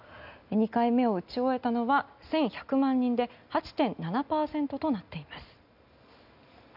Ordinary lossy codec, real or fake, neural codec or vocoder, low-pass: none; real; none; 5.4 kHz